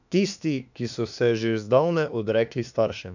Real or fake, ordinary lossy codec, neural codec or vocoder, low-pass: fake; none; autoencoder, 48 kHz, 32 numbers a frame, DAC-VAE, trained on Japanese speech; 7.2 kHz